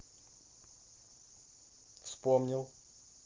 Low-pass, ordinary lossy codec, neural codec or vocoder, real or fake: 7.2 kHz; Opus, 16 kbps; none; real